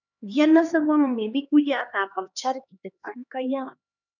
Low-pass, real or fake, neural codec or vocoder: 7.2 kHz; fake; codec, 16 kHz, 2 kbps, X-Codec, HuBERT features, trained on LibriSpeech